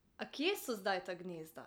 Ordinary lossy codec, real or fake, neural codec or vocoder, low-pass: none; real; none; none